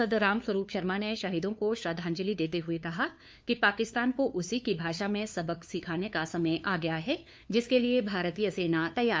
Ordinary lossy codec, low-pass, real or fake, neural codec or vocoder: none; none; fake; codec, 16 kHz, 2 kbps, FunCodec, trained on LibriTTS, 25 frames a second